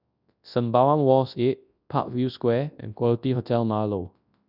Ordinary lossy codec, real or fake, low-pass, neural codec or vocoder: none; fake; 5.4 kHz; codec, 24 kHz, 0.9 kbps, WavTokenizer, large speech release